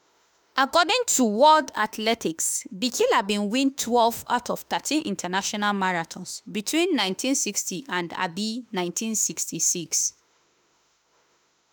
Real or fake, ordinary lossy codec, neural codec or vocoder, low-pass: fake; none; autoencoder, 48 kHz, 32 numbers a frame, DAC-VAE, trained on Japanese speech; none